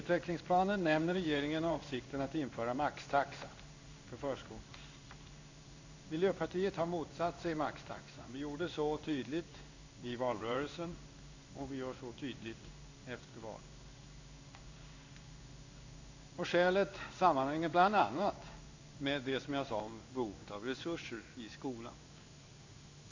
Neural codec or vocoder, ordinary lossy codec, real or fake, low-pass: codec, 16 kHz in and 24 kHz out, 1 kbps, XY-Tokenizer; AAC, 48 kbps; fake; 7.2 kHz